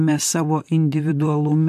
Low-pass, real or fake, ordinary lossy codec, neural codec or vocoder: 14.4 kHz; fake; MP3, 64 kbps; vocoder, 44.1 kHz, 128 mel bands, Pupu-Vocoder